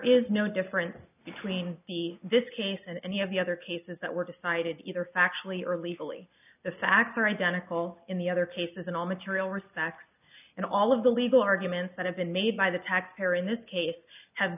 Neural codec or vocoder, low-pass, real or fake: none; 3.6 kHz; real